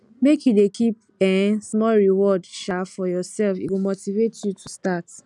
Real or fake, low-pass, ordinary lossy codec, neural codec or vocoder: real; 10.8 kHz; none; none